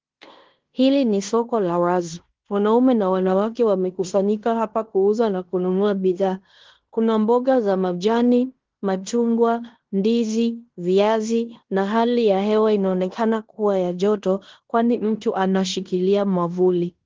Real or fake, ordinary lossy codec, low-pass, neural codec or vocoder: fake; Opus, 16 kbps; 7.2 kHz; codec, 16 kHz in and 24 kHz out, 0.9 kbps, LongCat-Audio-Codec, fine tuned four codebook decoder